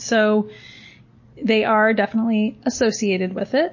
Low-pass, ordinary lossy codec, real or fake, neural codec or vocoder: 7.2 kHz; MP3, 32 kbps; real; none